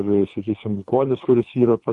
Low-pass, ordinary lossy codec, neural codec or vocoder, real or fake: 10.8 kHz; MP3, 96 kbps; codec, 24 kHz, 3 kbps, HILCodec; fake